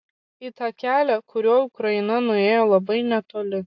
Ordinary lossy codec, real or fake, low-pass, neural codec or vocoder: AAC, 48 kbps; real; 7.2 kHz; none